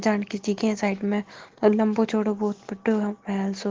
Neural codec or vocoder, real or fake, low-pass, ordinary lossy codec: none; real; 7.2 kHz; Opus, 16 kbps